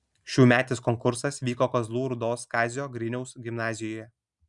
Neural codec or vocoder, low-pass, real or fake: none; 10.8 kHz; real